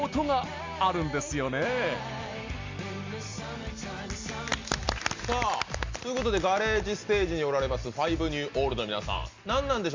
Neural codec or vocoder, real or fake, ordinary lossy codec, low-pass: none; real; MP3, 64 kbps; 7.2 kHz